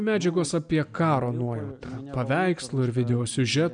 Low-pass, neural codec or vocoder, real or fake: 9.9 kHz; none; real